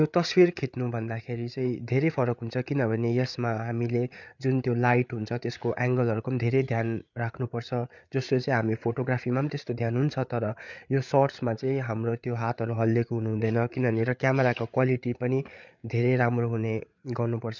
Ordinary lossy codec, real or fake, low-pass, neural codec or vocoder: none; fake; 7.2 kHz; codec, 16 kHz, 8 kbps, FreqCodec, larger model